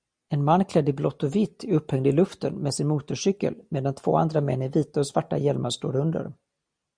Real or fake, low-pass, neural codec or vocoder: real; 9.9 kHz; none